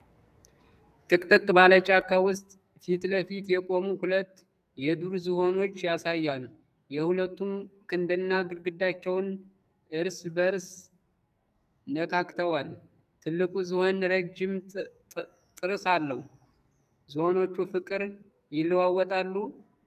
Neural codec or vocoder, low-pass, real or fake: codec, 44.1 kHz, 2.6 kbps, SNAC; 14.4 kHz; fake